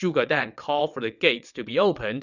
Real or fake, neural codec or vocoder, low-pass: fake; vocoder, 22.05 kHz, 80 mel bands, WaveNeXt; 7.2 kHz